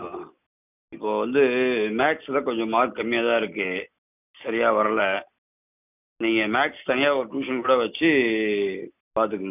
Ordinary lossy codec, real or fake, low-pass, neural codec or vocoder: none; real; 3.6 kHz; none